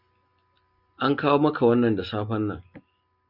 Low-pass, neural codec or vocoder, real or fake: 5.4 kHz; none; real